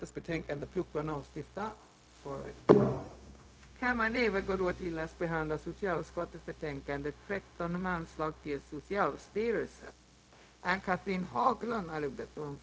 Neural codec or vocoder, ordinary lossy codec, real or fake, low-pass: codec, 16 kHz, 0.4 kbps, LongCat-Audio-Codec; none; fake; none